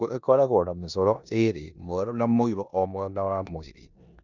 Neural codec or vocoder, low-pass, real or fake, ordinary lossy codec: codec, 16 kHz in and 24 kHz out, 0.9 kbps, LongCat-Audio-Codec, four codebook decoder; 7.2 kHz; fake; none